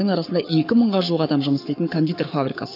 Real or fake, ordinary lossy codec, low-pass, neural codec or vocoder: fake; none; 5.4 kHz; codec, 44.1 kHz, 7.8 kbps, Pupu-Codec